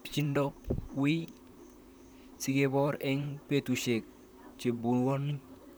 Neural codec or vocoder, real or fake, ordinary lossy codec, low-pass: vocoder, 44.1 kHz, 128 mel bands, Pupu-Vocoder; fake; none; none